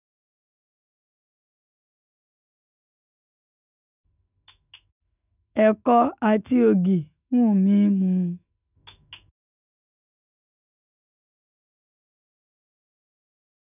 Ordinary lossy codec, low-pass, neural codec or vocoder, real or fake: none; 3.6 kHz; autoencoder, 48 kHz, 128 numbers a frame, DAC-VAE, trained on Japanese speech; fake